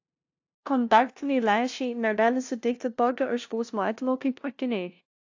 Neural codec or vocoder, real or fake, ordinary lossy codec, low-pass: codec, 16 kHz, 0.5 kbps, FunCodec, trained on LibriTTS, 25 frames a second; fake; AAC, 48 kbps; 7.2 kHz